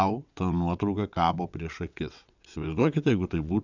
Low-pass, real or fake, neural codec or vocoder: 7.2 kHz; fake; vocoder, 22.05 kHz, 80 mel bands, WaveNeXt